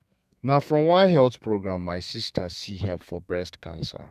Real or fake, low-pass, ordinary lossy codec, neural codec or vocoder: fake; 14.4 kHz; none; codec, 32 kHz, 1.9 kbps, SNAC